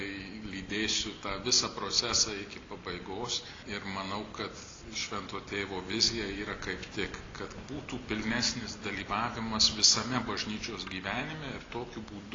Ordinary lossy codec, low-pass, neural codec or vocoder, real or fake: AAC, 32 kbps; 7.2 kHz; none; real